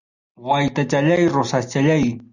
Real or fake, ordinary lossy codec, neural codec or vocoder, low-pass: real; Opus, 64 kbps; none; 7.2 kHz